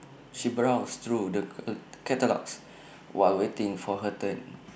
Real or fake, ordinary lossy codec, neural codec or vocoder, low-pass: real; none; none; none